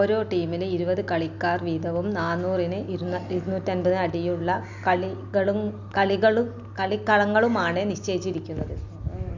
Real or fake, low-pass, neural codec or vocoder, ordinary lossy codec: real; 7.2 kHz; none; Opus, 64 kbps